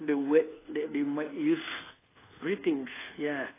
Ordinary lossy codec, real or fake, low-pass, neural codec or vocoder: AAC, 16 kbps; fake; 3.6 kHz; codec, 24 kHz, 1.2 kbps, DualCodec